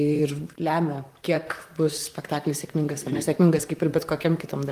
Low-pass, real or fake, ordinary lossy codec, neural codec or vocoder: 14.4 kHz; fake; Opus, 32 kbps; vocoder, 44.1 kHz, 128 mel bands, Pupu-Vocoder